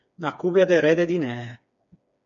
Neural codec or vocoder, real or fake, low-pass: codec, 16 kHz, 4 kbps, FreqCodec, smaller model; fake; 7.2 kHz